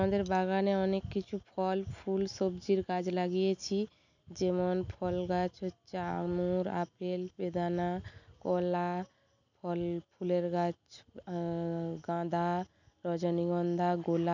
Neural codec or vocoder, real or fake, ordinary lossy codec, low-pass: none; real; none; 7.2 kHz